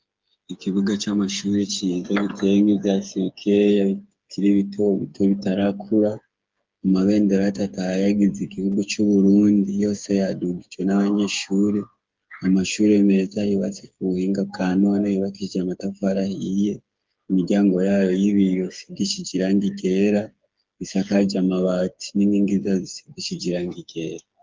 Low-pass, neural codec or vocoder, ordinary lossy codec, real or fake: 7.2 kHz; codec, 16 kHz, 8 kbps, FreqCodec, smaller model; Opus, 32 kbps; fake